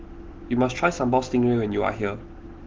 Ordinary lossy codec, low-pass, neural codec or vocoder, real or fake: Opus, 16 kbps; 7.2 kHz; none; real